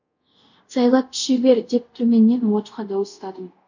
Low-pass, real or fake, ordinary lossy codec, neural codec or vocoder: 7.2 kHz; fake; none; codec, 24 kHz, 0.5 kbps, DualCodec